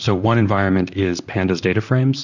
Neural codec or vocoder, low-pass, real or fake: vocoder, 44.1 kHz, 128 mel bands, Pupu-Vocoder; 7.2 kHz; fake